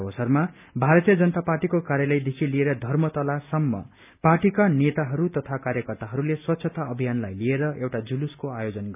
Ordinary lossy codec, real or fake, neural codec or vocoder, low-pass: none; real; none; 3.6 kHz